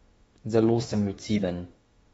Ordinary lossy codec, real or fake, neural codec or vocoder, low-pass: AAC, 24 kbps; fake; autoencoder, 48 kHz, 32 numbers a frame, DAC-VAE, trained on Japanese speech; 19.8 kHz